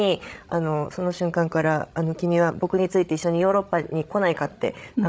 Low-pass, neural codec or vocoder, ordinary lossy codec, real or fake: none; codec, 16 kHz, 16 kbps, FreqCodec, larger model; none; fake